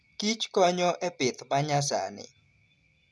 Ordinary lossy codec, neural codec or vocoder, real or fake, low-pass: none; none; real; none